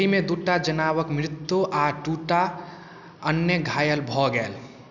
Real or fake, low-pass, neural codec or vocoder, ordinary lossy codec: real; 7.2 kHz; none; none